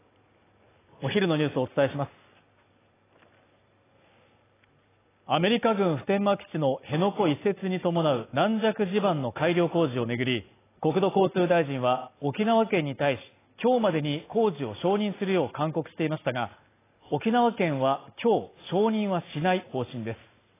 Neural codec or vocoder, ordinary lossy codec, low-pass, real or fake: none; AAC, 16 kbps; 3.6 kHz; real